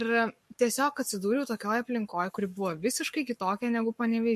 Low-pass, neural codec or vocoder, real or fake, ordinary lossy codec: 14.4 kHz; codec, 44.1 kHz, 7.8 kbps, Pupu-Codec; fake; MP3, 64 kbps